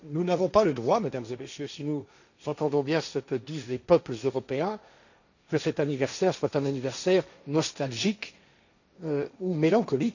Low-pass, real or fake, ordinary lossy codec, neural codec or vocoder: 7.2 kHz; fake; none; codec, 16 kHz, 1.1 kbps, Voila-Tokenizer